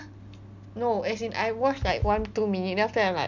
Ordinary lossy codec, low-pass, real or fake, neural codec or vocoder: none; 7.2 kHz; real; none